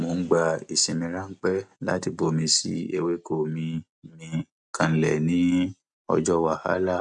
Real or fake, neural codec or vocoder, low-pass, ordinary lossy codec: real; none; 10.8 kHz; none